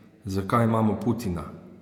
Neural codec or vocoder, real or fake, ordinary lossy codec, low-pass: vocoder, 48 kHz, 128 mel bands, Vocos; fake; none; 19.8 kHz